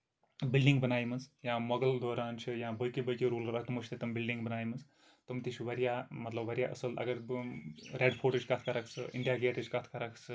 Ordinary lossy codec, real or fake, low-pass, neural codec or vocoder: none; real; none; none